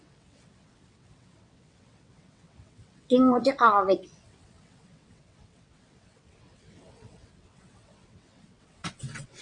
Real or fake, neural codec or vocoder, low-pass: fake; vocoder, 22.05 kHz, 80 mel bands, WaveNeXt; 9.9 kHz